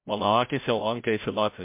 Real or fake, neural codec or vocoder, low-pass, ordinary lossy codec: fake; codec, 16 kHz, 0.5 kbps, FreqCodec, larger model; 3.6 kHz; MP3, 32 kbps